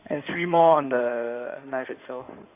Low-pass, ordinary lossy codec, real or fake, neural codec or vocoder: 3.6 kHz; AAC, 24 kbps; fake; codec, 16 kHz in and 24 kHz out, 2.2 kbps, FireRedTTS-2 codec